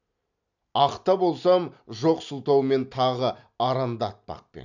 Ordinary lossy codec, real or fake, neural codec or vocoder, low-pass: none; real; none; 7.2 kHz